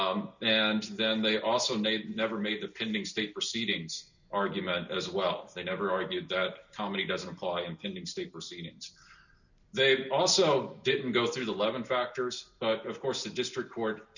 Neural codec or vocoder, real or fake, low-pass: none; real; 7.2 kHz